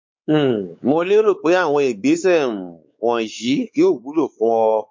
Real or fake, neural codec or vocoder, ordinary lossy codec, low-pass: fake; codec, 16 kHz, 4 kbps, X-Codec, WavLM features, trained on Multilingual LibriSpeech; MP3, 48 kbps; 7.2 kHz